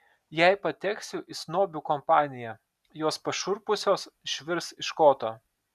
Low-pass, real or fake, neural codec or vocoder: 14.4 kHz; real; none